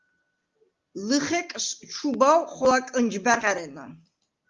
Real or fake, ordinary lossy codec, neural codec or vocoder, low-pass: real; Opus, 32 kbps; none; 7.2 kHz